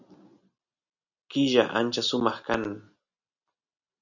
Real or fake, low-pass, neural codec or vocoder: real; 7.2 kHz; none